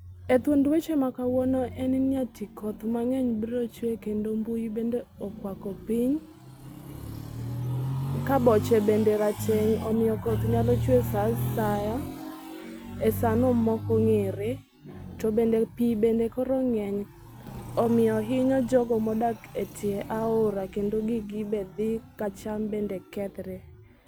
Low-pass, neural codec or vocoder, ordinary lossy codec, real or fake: none; none; none; real